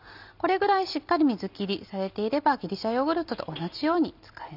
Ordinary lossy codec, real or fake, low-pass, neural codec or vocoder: none; real; 5.4 kHz; none